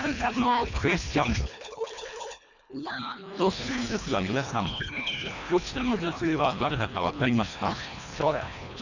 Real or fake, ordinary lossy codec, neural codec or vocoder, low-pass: fake; none; codec, 24 kHz, 1.5 kbps, HILCodec; 7.2 kHz